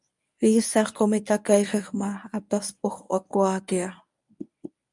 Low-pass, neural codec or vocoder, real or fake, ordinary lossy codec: 10.8 kHz; codec, 24 kHz, 0.9 kbps, WavTokenizer, medium speech release version 1; fake; MP3, 96 kbps